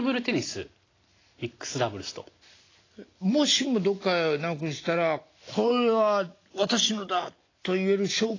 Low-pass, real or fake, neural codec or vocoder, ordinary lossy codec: 7.2 kHz; real; none; AAC, 32 kbps